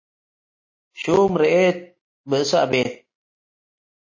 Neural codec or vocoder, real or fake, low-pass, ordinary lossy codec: none; real; 7.2 kHz; MP3, 32 kbps